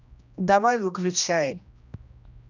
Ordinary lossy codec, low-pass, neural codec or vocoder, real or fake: none; 7.2 kHz; codec, 16 kHz, 1 kbps, X-Codec, HuBERT features, trained on general audio; fake